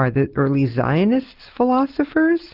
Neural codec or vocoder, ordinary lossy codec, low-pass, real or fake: none; Opus, 32 kbps; 5.4 kHz; real